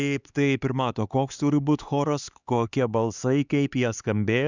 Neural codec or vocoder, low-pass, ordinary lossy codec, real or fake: codec, 16 kHz, 4 kbps, X-Codec, HuBERT features, trained on LibriSpeech; 7.2 kHz; Opus, 64 kbps; fake